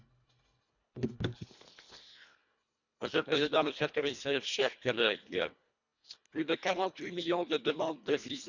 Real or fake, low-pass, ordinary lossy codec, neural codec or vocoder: fake; 7.2 kHz; none; codec, 24 kHz, 1.5 kbps, HILCodec